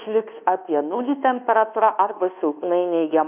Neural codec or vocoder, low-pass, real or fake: codec, 24 kHz, 1.2 kbps, DualCodec; 3.6 kHz; fake